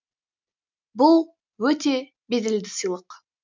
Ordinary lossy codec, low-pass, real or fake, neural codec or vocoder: MP3, 64 kbps; 7.2 kHz; real; none